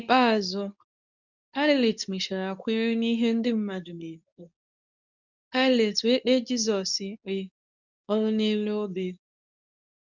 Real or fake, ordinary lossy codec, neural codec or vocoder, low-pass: fake; none; codec, 24 kHz, 0.9 kbps, WavTokenizer, medium speech release version 2; 7.2 kHz